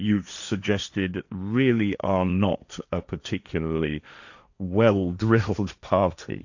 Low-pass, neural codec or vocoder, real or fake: 7.2 kHz; codec, 16 kHz, 1.1 kbps, Voila-Tokenizer; fake